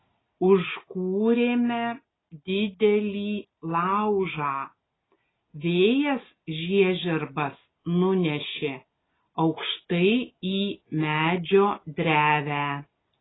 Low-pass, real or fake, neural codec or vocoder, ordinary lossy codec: 7.2 kHz; real; none; AAC, 16 kbps